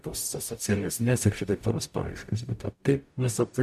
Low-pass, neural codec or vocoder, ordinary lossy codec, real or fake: 14.4 kHz; codec, 44.1 kHz, 0.9 kbps, DAC; AAC, 96 kbps; fake